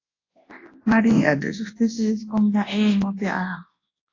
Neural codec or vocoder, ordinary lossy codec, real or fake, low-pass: codec, 24 kHz, 0.9 kbps, WavTokenizer, large speech release; AAC, 32 kbps; fake; 7.2 kHz